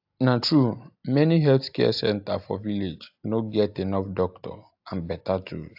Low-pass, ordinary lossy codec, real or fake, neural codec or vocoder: 5.4 kHz; AAC, 48 kbps; real; none